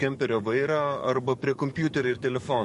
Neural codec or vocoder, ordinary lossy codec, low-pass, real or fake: codec, 44.1 kHz, 7.8 kbps, DAC; MP3, 48 kbps; 14.4 kHz; fake